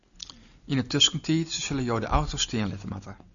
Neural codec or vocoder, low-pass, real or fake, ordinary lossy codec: none; 7.2 kHz; real; MP3, 96 kbps